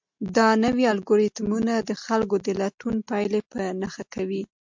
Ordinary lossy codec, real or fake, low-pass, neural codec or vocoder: MP3, 64 kbps; real; 7.2 kHz; none